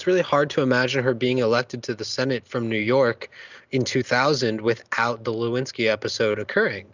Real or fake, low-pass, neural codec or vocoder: real; 7.2 kHz; none